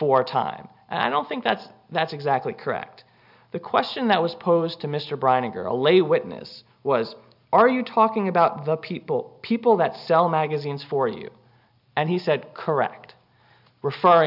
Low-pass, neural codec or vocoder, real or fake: 5.4 kHz; none; real